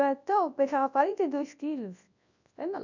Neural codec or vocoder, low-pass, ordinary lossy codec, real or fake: codec, 24 kHz, 0.9 kbps, WavTokenizer, large speech release; 7.2 kHz; none; fake